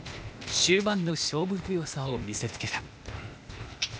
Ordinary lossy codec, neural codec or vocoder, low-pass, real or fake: none; codec, 16 kHz, 0.8 kbps, ZipCodec; none; fake